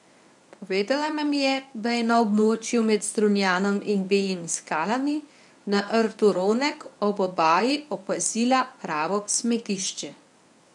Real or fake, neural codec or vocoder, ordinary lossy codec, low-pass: fake; codec, 24 kHz, 0.9 kbps, WavTokenizer, medium speech release version 1; none; 10.8 kHz